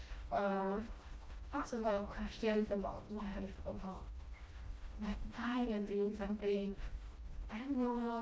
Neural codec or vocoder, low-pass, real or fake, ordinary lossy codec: codec, 16 kHz, 1 kbps, FreqCodec, smaller model; none; fake; none